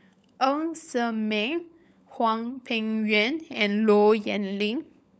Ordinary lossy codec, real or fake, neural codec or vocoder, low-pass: none; fake; codec, 16 kHz, 16 kbps, FunCodec, trained on LibriTTS, 50 frames a second; none